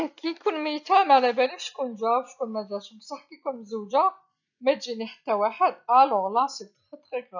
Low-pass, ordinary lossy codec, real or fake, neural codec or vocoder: 7.2 kHz; none; real; none